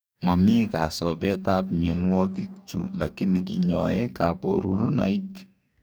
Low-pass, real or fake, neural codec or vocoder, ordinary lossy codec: none; fake; codec, 44.1 kHz, 2.6 kbps, DAC; none